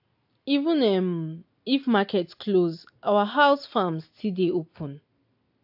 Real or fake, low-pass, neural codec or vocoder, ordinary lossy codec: real; 5.4 kHz; none; AAC, 48 kbps